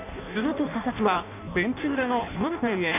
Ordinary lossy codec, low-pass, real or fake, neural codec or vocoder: none; 3.6 kHz; fake; codec, 16 kHz in and 24 kHz out, 0.6 kbps, FireRedTTS-2 codec